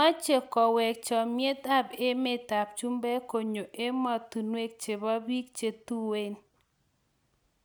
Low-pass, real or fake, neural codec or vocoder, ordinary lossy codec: none; real; none; none